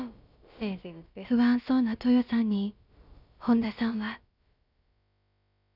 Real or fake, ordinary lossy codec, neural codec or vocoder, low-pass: fake; none; codec, 16 kHz, about 1 kbps, DyCAST, with the encoder's durations; 5.4 kHz